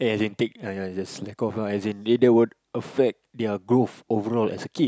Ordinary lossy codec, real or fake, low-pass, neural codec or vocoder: none; real; none; none